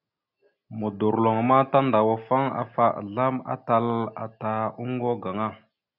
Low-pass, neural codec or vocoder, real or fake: 5.4 kHz; none; real